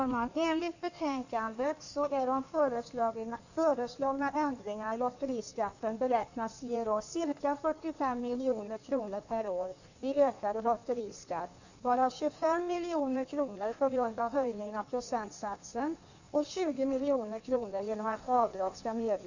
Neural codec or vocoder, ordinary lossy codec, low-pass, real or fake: codec, 16 kHz in and 24 kHz out, 1.1 kbps, FireRedTTS-2 codec; none; 7.2 kHz; fake